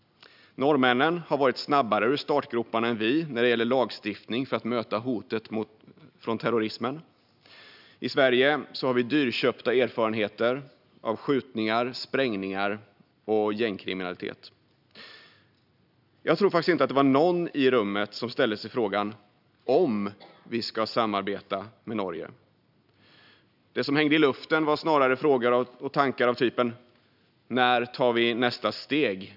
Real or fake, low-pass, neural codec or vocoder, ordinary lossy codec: real; 5.4 kHz; none; none